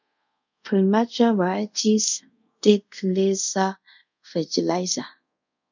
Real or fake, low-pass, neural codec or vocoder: fake; 7.2 kHz; codec, 24 kHz, 0.5 kbps, DualCodec